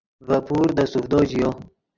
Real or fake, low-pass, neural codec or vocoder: real; 7.2 kHz; none